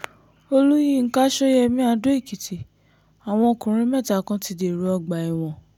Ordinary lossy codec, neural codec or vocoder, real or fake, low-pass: none; none; real; 19.8 kHz